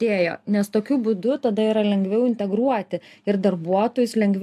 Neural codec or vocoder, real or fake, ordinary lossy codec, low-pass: none; real; MP3, 96 kbps; 14.4 kHz